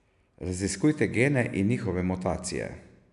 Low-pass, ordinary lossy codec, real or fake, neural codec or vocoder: 10.8 kHz; none; real; none